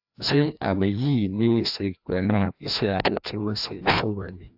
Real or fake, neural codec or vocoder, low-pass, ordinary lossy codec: fake; codec, 16 kHz, 1 kbps, FreqCodec, larger model; 5.4 kHz; AAC, 48 kbps